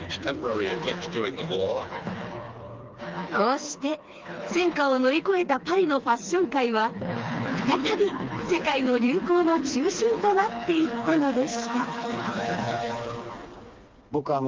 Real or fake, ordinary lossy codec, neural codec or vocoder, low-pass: fake; Opus, 24 kbps; codec, 16 kHz, 2 kbps, FreqCodec, smaller model; 7.2 kHz